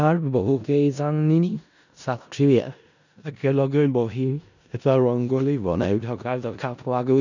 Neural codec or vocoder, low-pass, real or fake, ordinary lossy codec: codec, 16 kHz in and 24 kHz out, 0.4 kbps, LongCat-Audio-Codec, four codebook decoder; 7.2 kHz; fake; none